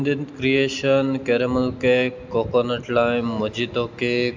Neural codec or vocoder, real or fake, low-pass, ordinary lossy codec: none; real; 7.2 kHz; MP3, 64 kbps